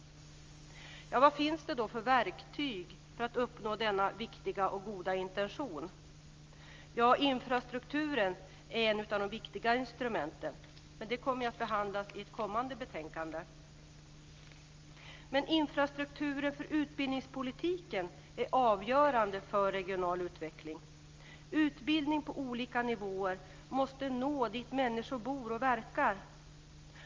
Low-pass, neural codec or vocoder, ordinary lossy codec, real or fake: 7.2 kHz; none; Opus, 32 kbps; real